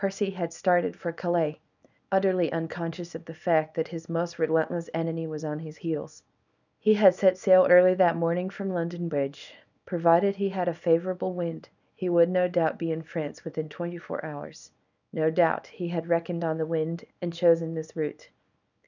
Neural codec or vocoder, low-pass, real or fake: codec, 24 kHz, 0.9 kbps, WavTokenizer, small release; 7.2 kHz; fake